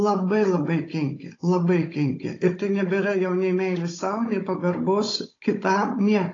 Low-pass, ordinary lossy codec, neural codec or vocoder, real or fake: 7.2 kHz; AAC, 32 kbps; codec, 16 kHz, 16 kbps, FunCodec, trained on Chinese and English, 50 frames a second; fake